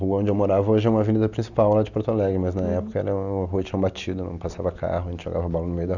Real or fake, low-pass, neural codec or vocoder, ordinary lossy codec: real; 7.2 kHz; none; none